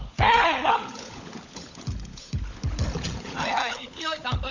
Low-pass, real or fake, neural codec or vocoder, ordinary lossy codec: 7.2 kHz; fake; codec, 16 kHz, 16 kbps, FunCodec, trained on LibriTTS, 50 frames a second; none